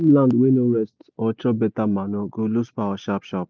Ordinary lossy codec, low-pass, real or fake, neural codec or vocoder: none; none; real; none